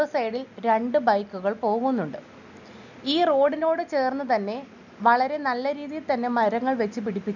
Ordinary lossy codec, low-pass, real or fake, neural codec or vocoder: none; 7.2 kHz; real; none